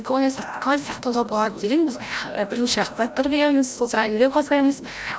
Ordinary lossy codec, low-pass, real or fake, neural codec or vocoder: none; none; fake; codec, 16 kHz, 0.5 kbps, FreqCodec, larger model